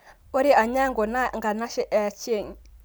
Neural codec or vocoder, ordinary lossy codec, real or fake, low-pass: vocoder, 44.1 kHz, 128 mel bands every 256 samples, BigVGAN v2; none; fake; none